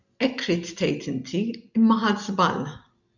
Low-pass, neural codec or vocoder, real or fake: 7.2 kHz; none; real